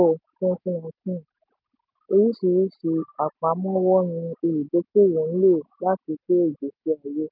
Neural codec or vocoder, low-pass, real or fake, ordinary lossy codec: none; 5.4 kHz; real; none